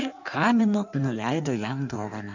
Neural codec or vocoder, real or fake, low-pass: codec, 16 kHz in and 24 kHz out, 1.1 kbps, FireRedTTS-2 codec; fake; 7.2 kHz